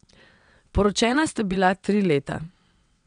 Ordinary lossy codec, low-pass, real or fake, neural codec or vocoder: MP3, 96 kbps; 9.9 kHz; fake; vocoder, 22.05 kHz, 80 mel bands, WaveNeXt